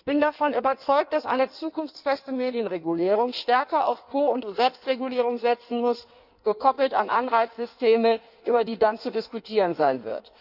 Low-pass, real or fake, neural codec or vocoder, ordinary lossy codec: 5.4 kHz; fake; codec, 16 kHz in and 24 kHz out, 1.1 kbps, FireRedTTS-2 codec; none